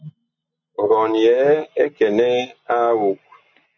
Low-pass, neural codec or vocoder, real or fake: 7.2 kHz; none; real